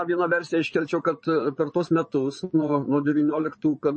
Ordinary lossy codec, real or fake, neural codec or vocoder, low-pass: MP3, 32 kbps; fake; vocoder, 22.05 kHz, 80 mel bands, Vocos; 9.9 kHz